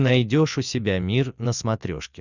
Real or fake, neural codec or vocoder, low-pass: real; none; 7.2 kHz